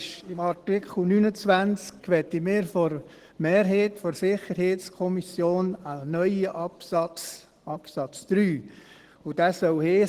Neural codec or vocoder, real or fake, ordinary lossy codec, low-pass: none; real; Opus, 16 kbps; 14.4 kHz